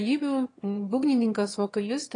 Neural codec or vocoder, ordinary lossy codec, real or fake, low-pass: autoencoder, 22.05 kHz, a latent of 192 numbers a frame, VITS, trained on one speaker; AAC, 32 kbps; fake; 9.9 kHz